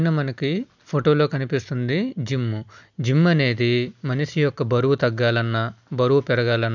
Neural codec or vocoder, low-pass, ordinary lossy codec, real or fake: none; 7.2 kHz; none; real